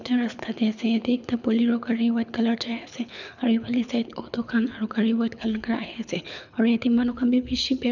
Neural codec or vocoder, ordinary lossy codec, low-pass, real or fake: codec, 24 kHz, 6 kbps, HILCodec; none; 7.2 kHz; fake